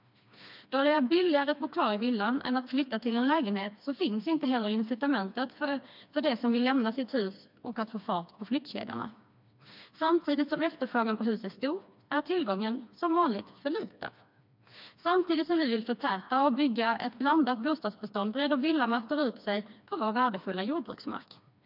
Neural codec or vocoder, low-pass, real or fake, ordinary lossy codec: codec, 16 kHz, 2 kbps, FreqCodec, smaller model; 5.4 kHz; fake; MP3, 48 kbps